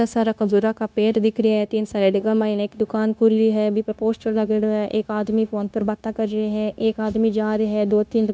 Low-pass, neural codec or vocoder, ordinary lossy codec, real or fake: none; codec, 16 kHz, 0.9 kbps, LongCat-Audio-Codec; none; fake